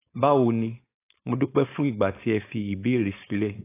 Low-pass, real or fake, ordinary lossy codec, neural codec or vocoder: 3.6 kHz; fake; AAC, 24 kbps; codec, 16 kHz, 4.8 kbps, FACodec